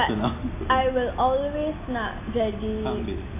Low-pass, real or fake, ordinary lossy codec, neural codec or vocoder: 3.6 kHz; real; AAC, 24 kbps; none